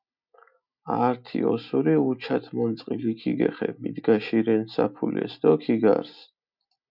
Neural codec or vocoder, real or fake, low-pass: none; real; 5.4 kHz